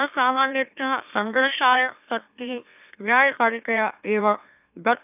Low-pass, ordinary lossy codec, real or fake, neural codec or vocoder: 3.6 kHz; none; fake; autoencoder, 44.1 kHz, a latent of 192 numbers a frame, MeloTTS